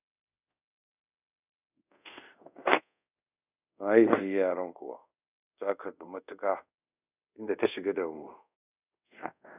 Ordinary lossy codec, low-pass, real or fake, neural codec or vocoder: none; 3.6 kHz; fake; codec, 24 kHz, 0.5 kbps, DualCodec